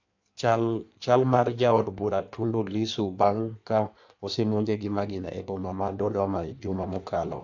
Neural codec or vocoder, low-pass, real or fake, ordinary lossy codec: codec, 16 kHz in and 24 kHz out, 1.1 kbps, FireRedTTS-2 codec; 7.2 kHz; fake; AAC, 48 kbps